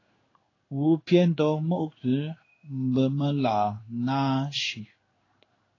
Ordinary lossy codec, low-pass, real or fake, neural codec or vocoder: AAC, 32 kbps; 7.2 kHz; fake; codec, 16 kHz in and 24 kHz out, 1 kbps, XY-Tokenizer